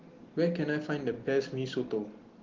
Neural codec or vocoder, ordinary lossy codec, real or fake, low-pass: none; Opus, 16 kbps; real; 7.2 kHz